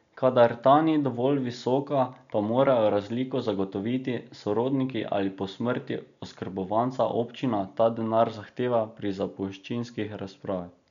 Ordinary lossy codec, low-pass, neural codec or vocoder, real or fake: none; 7.2 kHz; none; real